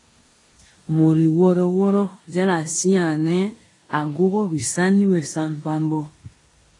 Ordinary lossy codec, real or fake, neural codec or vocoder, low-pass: AAC, 32 kbps; fake; codec, 16 kHz in and 24 kHz out, 0.9 kbps, LongCat-Audio-Codec, four codebook decoder; 10.8 kHz